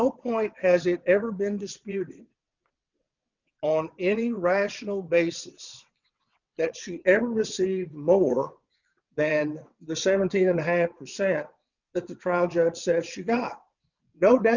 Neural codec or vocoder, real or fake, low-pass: vocoder, 22.05 kHz, 80 mel bands, WaveNeXt; fake; 7.2 kHz